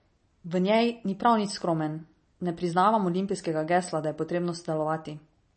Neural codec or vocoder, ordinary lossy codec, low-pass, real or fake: none; MP3, 32 kbps; 10.8 kHz; real